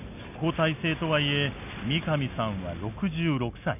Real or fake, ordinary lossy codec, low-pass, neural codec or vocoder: real; none; 3.6 kHz; none